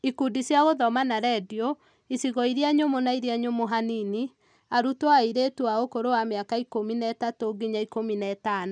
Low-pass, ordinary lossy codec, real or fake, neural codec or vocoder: 9.9 kHz; none; real; none